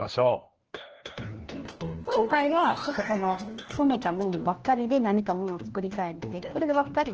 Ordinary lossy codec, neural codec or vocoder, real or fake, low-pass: Opus, 16 kbps; codec, 16 kHz, 1 kbps, FunCodec, trained on LibriTTS, 50 frames a second; fake; 7.2 kHz